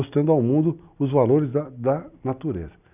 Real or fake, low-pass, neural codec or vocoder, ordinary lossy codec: real; 3.6 kHz; none; none